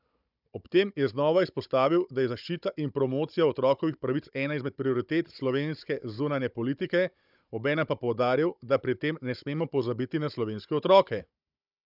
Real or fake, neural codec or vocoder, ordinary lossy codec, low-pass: fake; codec, 16 kHz, 16 kbps, FunCodec, trained on Chinese and English, 50 frames a second; none; 5.4 kHz